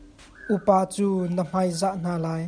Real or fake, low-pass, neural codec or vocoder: real; 9.9 kHz; none